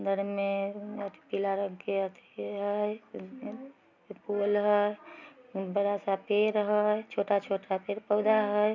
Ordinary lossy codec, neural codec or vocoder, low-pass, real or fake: none; none; 7.2 kHz; real